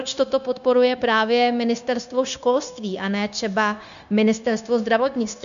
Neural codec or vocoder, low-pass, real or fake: codec, 16 kHz, 0.9 kbps, LongCat-Audio-Codec; 7.2 kHz; fake